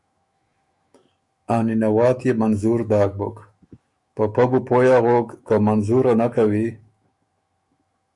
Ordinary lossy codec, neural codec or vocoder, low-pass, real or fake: Opus, 64 kbps; autoencoder, 48 kHz, 128 numbers a frame, DAC-VAE, trained on Japanese speech; 10.8 kHz; fake